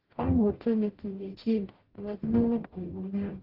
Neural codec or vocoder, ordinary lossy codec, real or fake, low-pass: codec, 44.1 kHz, 0.9 kbps, DAC; Opus, 16 kbps; fake; 5.4 kHz